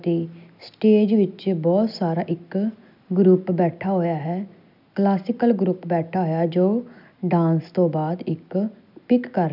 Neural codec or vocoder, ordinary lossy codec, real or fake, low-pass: none; none; real; 5.4 kHz